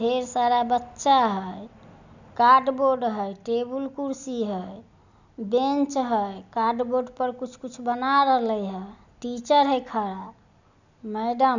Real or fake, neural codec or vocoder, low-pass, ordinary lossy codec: real; none; 7.2 kHz; none